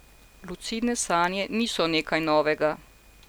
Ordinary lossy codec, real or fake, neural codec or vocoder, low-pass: none; real; none; none